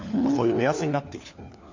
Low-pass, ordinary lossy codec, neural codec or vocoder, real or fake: 7.2 kHz; AAC, 48 kbps; codec, 16 kHz, 4 kbps, FunCodec, trained on LibriTTS, 50 frames a second; fake